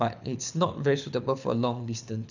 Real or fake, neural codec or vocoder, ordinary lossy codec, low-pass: fake; codec, 24 kHz, 6 kbps, HILCodec; none; 7.2 kHz